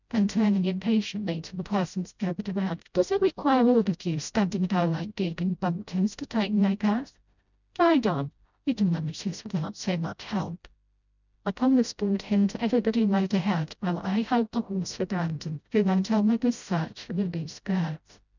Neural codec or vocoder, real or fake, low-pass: codec, 16 kHz, 0.5 kbps, FreqCodec, smaller model; fake; 7.2 kHz